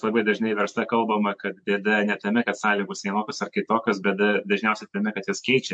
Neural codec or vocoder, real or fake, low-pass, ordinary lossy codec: none; real; 9.9 kHz; MP3, 64 kbps